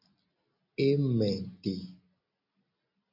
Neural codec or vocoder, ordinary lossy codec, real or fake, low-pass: none; AAC, 48 kbps; real; 5.4 kHz